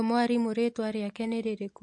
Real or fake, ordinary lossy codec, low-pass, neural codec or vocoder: real; MP3, 48 kbps; 10.8 kHz; none